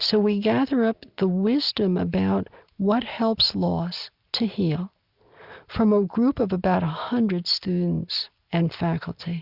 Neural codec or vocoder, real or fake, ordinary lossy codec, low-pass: none; real; Opus, 64 kbps; 5.4 kHz